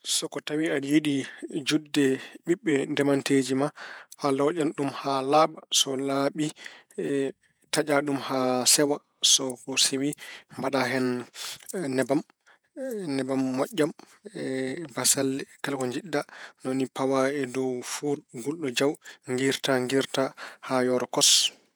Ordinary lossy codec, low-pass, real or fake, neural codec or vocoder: none; none; fake; vocoder, 48 kHz, 128 mel bands, Vocos